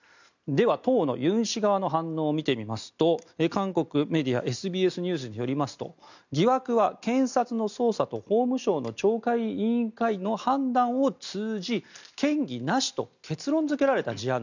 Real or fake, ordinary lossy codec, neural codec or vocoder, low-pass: real; none; none; 7.2 kHz